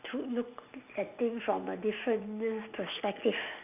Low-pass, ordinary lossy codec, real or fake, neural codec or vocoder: 3.6 kHz; none; real; none